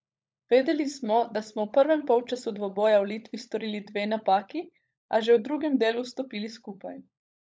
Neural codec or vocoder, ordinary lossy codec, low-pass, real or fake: codec, 16 kHz, 16 kbps, FunCodec, trained on LibriTTS, 50 frames a second; none; none; fake